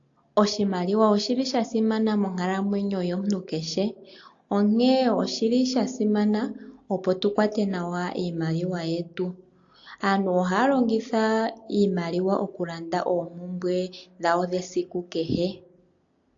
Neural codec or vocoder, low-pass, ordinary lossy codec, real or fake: none; 7.2 kHz; AAC, 48 kbps; real